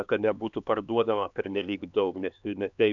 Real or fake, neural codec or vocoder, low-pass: fake; codec, 16 kHz, 2 kbps, X-Codec, HuBERT features, trained on LibriSpeech; 7.2 kHz